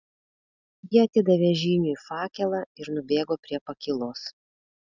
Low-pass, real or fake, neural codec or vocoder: 7.2 kHz; real; none